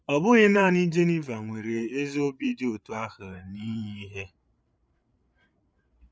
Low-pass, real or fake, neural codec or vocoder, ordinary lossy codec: none; fake; codec, 16 kHz, 8 kbps, FreqCodec, larger model; none